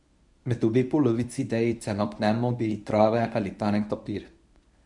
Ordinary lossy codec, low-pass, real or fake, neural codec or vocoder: none; 10.8 kHz; fake; codec, 24 kHz, 0.9 kbps, WavTokenizer, medium speech release version 1